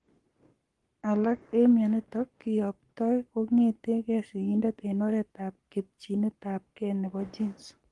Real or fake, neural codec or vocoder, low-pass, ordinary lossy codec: fake; codec, 44.1 kHz, 7.8 kbps, Pupu-Codec; 10.8 kHz; Opus, 24 kbps